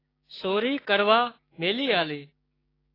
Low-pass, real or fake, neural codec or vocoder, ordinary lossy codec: 5.4 kHz; fake; codec, 16 kHz, 6 kbps, DAC; AAC, 24 kbps